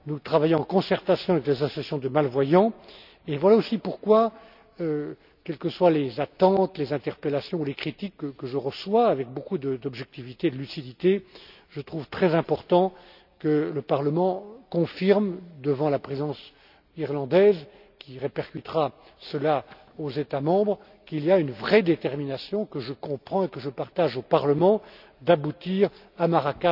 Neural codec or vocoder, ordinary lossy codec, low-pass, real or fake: none; none; 5.4 kHz; real